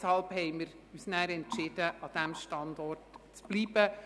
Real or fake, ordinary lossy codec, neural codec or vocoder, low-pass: real; none; none; none